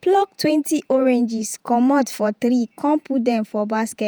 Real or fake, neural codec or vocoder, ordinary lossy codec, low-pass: fake; vocoder, 48 kHz, 128 mel bands, Vocos; none; none